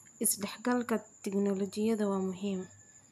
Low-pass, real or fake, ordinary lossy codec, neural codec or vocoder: 14.4 kHz; real; none; none